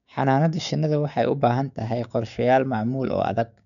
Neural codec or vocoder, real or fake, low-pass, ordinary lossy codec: codec, 16 kHz, 6 kbps, DAC; fake; 7.2 kHz; none